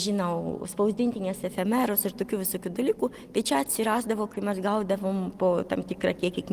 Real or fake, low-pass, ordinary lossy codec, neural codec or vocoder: real; 14.4 kHz; Opus, 24 kbps; none